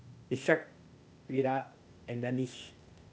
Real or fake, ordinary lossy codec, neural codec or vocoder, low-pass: fake; none; codec, 16 kHz, 0.8 kbps, ZipCodec; none